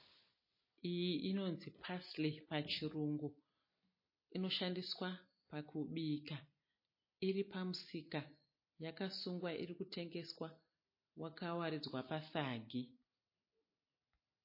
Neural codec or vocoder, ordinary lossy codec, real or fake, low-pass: none; MP3, 24 kbps; real; 5.4 kHz